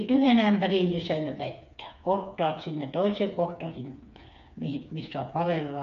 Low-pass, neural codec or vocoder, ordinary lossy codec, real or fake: 7.2 kHz; codec, 16 kHz, 4 kbps, FreqCodec, smaller model; none; fake